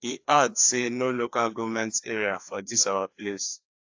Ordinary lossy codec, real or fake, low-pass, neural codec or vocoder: AAC, 48 kbps; fake; 7.2 kHz; codec, 16 kHz, 2 kbps, FreqCodec, larger model